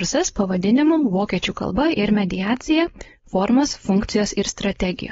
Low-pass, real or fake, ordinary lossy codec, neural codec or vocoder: 7.2 kHz; fake; AAC, 24 kbps; codec, 16 kHz, 4.8 kbps, FACodec